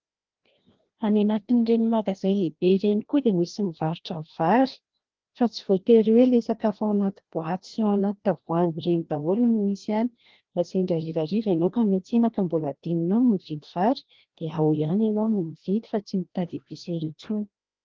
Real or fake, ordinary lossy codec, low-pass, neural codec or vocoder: fake; Opus, 16 kbps; 7.2 kHz; codec, 16 kHz, 1 kbps, FreqCodec, larger model